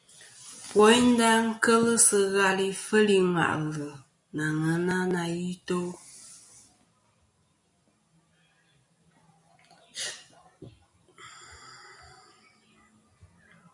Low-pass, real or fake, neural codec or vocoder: 10.8 kHz; real; none